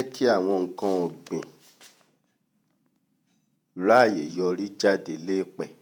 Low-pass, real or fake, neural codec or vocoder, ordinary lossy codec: none; fake; vocoder, 48 kHz, 128 mel bands, Vocos; none